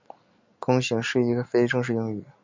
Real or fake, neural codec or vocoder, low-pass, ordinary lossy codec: real; none; 7.2 kHz; MP3, 64 kbps